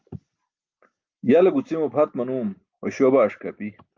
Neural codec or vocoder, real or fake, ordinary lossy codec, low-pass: none; real; Opus, 24 kbps; 7.2 kHz